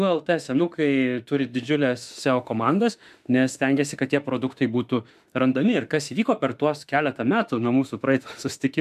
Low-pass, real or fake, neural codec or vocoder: 14.4 kHz; fake; autoencoder, 48 kHz, 32 numbers a frame, DAC-VAE, trained on Japanese speech